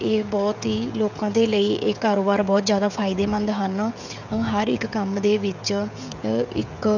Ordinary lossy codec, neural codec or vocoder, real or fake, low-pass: none; codec, 16 kHz, 16 kbps, FreqCodec, smaller model; fake; 7.2 kHz